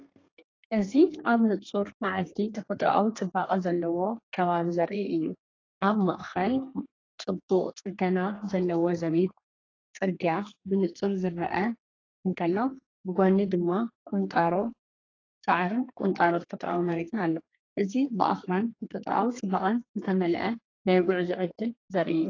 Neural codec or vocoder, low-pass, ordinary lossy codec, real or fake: codec, 32 kHz, 1.9 kbps, SNAC; 7.2 kHz; AAC, 32 kbps; fake